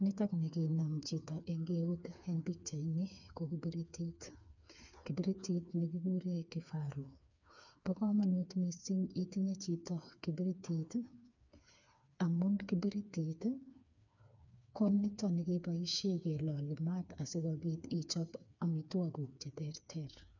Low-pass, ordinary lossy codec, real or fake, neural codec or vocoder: 7.2 kHz; none; fake; codec, 16 kHz, 4 kbps, FreqCodec, smaller model